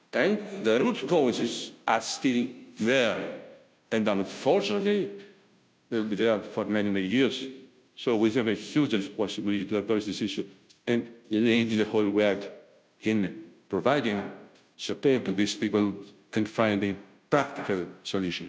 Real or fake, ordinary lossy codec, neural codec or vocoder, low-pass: fake; none; codec, 16 kHz, 0.5 kbps, FunCodec, trained on Chinese and English, 25 frames a second; none